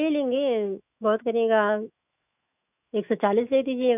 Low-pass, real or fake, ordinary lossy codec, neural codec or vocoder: 3.6 kHz; fake; none; autoencoder, 48 kHz, 128 numbers a frame, DAC-VAE, trained on Japanese speech